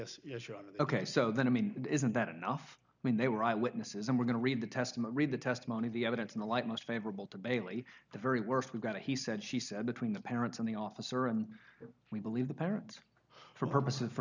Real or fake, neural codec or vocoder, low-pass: real; none; 7.2 kHz